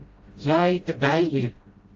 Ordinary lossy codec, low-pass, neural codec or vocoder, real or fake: Opus, 32 kbps; 7.2 kHz; codec, 16 kHz, 0.5 kbps, FreqCodec, smaller model; fake